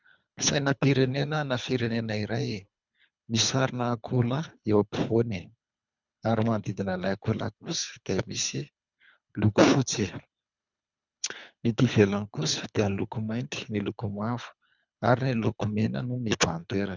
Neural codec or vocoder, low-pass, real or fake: codec, 24 kHz, 3 kbps, HILCodec; 7.2 kHz; fake